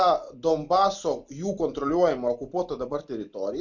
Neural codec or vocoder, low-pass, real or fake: none; 7.2 kHz; real